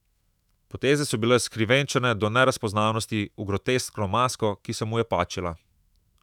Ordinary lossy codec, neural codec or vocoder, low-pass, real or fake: none; autoencoder, 48 kHz, 128 numbers a frame, DAC-VAE, trained on Japanese speech; 19.8 kHz; fake